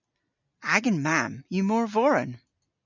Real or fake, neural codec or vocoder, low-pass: real; none; 7.2 kHz